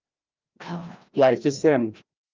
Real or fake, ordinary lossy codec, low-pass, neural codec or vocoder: fake; Opus, 24 kbps; 7.2 kHz; codec, 16 kHz, 1 kbps, FreqCodec, larger model